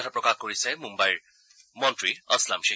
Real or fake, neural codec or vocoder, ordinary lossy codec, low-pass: real; none; none; none